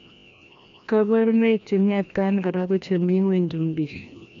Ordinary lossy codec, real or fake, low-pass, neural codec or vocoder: MP3, 64 kbps; fake; 7.2 kHz; codec, 16 kHz, 1 kbps, FreqCodec, larger model